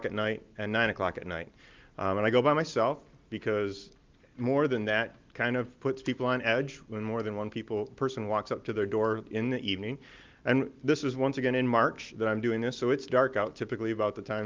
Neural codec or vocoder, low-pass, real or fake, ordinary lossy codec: none; 7.2 kHz; real; Opus, 32 kbps